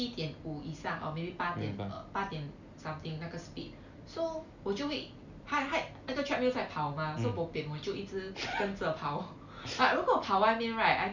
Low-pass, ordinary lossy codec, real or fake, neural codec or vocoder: 7.2 kHz; none; real; none